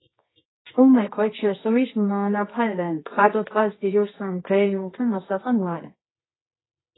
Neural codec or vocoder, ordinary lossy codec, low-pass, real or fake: codec, 24 kHz, 0.9 kbps, WavTokenizer, medium music audio release; AAC, 16 kbps; 7.2 kHz; fake